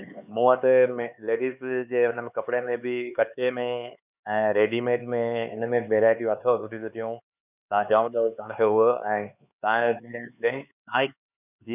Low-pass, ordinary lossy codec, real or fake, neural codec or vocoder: 3.6 kHz; none; fake; codec, 16 kHz, 4 kbps, X-Codec, HuBERT features, trained on LibriSpeech